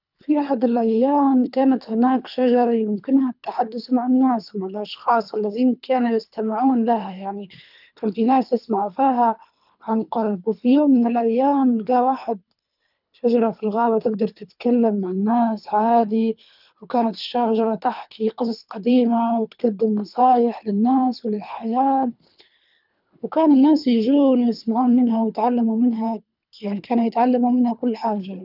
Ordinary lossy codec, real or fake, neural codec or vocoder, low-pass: none; fake; codec, 24 kHz, 3 kbps, HILCodec; 5.4 kHz